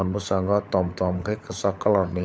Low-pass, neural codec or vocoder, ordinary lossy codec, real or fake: none; codec, 16 kHz, 16 kbps, FunCodec, trained on LibriTTS, 50 frames a second; none; fake